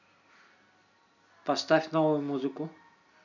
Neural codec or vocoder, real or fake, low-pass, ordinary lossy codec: none; real; 7.2 kHz; none